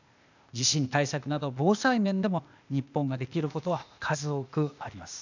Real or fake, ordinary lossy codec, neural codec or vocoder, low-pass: fake; none; codec, 16 kHz, 0.8 kbps, ZipCodec; 7.2 kHz